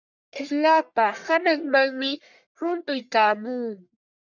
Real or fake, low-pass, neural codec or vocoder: fake; 7.2 kHz; codec, 44.1 kHz, 1.7 kbps, Pupu-Codec